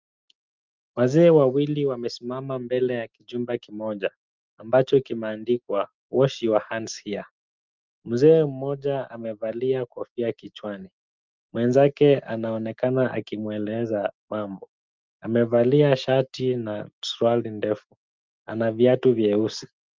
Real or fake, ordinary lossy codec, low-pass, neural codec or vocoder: real; Opus, 24 kbps; 7.2 kHz; none